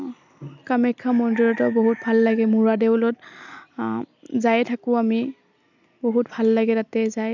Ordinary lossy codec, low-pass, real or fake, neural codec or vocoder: none; 7.2 kHz; real; none